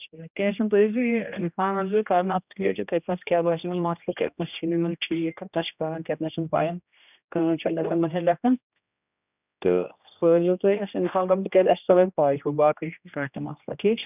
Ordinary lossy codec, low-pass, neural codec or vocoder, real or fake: none; 3.6 kHz; codec, 16 kHz, 1 kbps, X-Codec, HuBERT features, trained on general audio; fake